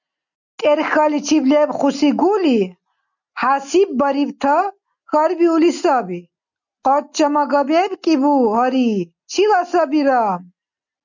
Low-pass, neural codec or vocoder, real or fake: 7.2 kHz; none; real